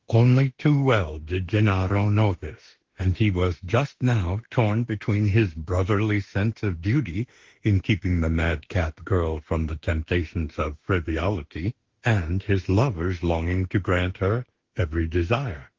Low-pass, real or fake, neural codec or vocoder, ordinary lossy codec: 7.2 kHz; fake; autoencoder, 48 kHz, 32 numbers a frame, DAC-VAE, trained on Japanese speech; Opus, 16 kbps